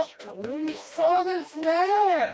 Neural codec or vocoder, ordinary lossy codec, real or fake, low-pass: codec, 16 kHz, 1 kbps, FreqCodec, smaller model; none; fake; none